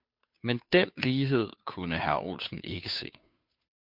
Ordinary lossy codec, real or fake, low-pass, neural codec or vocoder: MP3, 48 kbps; fake; 5.4 kHz; codec, 16 kHz, 2 kbps, FunCodec, trained on Chinese and English, 25 frames a second